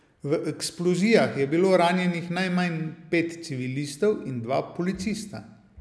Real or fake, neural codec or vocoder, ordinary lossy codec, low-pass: real; none; none; none